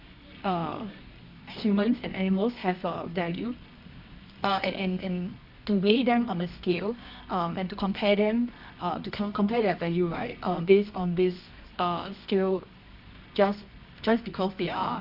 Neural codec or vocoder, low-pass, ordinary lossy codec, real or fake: codec, 24 kHz, 0.9 kbps, WavTokenizer, medium music audio release; 5.4 kHz; none; fake